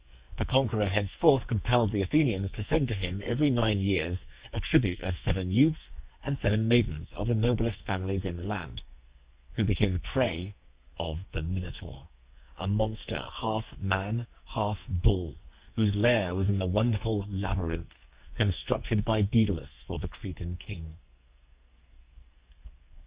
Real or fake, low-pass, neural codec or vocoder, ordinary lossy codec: fake; 3.6 kHz; codec, 44.1 kHz, 2.6 kbps, SNAC; Opus, 64 kbps